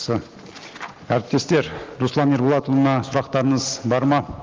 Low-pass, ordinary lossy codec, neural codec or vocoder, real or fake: 7.2 kHz; Opus, 16 kbps; none; real